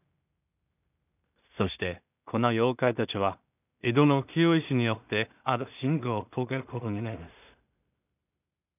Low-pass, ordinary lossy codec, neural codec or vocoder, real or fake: 3.6 kHz; none; codec, 16 kHz in and 24 kHz out, 0.4 kbps, LongCat-Audio-Codec, two codebook decoder; fake